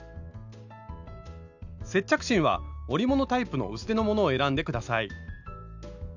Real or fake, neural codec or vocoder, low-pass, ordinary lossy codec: real; none; 7.2 kHz; none